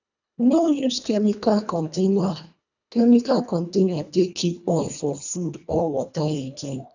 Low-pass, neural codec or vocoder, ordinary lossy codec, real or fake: 7.2 kHz; codec, 24 kHz, 1.5 kbps, HILCodec; none; fake